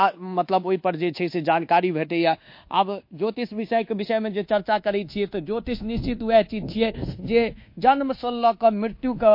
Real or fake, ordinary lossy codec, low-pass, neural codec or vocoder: fake; MP3, 32 kbps; 5.4 kHz; codec, 24 kHz, 1.2 kbps, DualCodec